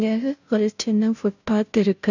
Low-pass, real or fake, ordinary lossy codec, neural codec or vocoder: 7.2 kHz; fake; none; codec, 16 kHz, 0.5 kbps, FunCodec, trained on Chinese and English, 25 frames a second